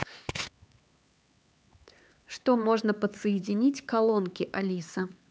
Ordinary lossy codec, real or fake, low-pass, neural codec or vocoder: none; fake; none; codec, 16 kHz, 4 kbps, X-Codec, HuBERT features, trained on LibriSpeech